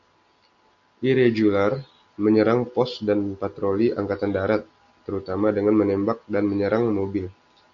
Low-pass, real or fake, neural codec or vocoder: 7.2 kHz; real; none